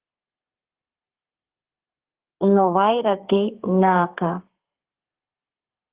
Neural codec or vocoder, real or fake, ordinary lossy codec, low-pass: codec, 32 kHz, 1.9 kbps, SNAC; fake; Opus, 16 kbps; 3.6 kHz